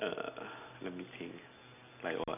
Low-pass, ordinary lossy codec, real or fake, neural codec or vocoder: 3.6 kHz; none; real; none